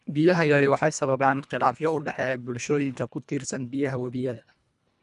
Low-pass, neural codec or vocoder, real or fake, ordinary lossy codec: 10.8 kHz; codec, 24 kHz, 1.5 kbps, HILCodec; fake; none